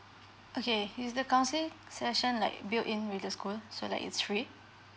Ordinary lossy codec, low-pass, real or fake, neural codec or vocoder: none; none; real; none